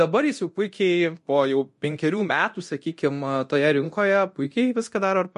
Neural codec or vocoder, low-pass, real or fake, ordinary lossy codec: codec, 24 kHz, 0.9 kbps, DualCodec; 10.8 kHz; fake; MP3, 48 kbps